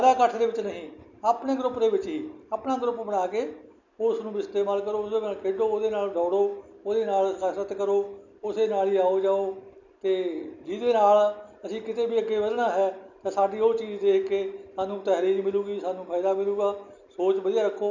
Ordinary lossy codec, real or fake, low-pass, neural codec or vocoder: none; real; 7.2 kHz; none